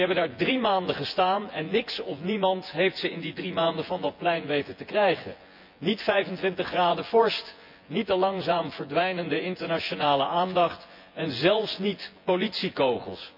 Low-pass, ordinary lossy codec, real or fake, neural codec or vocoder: 5.4 kHz; MP3, 48 kbps; fake; vocoder, 24 kHz, 100 mel bands, Vocos